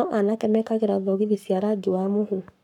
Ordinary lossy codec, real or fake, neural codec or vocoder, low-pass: none; fake; codec, 44.1 kHz, 7.8 kbps, Pupu-Codec; 19.8 kHz